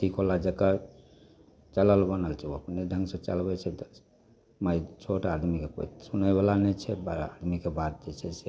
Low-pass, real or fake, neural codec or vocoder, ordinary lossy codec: none; real; none; none